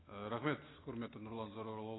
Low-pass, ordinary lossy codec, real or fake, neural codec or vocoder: 7.2 kHz; AAC, 16 kbps; real; none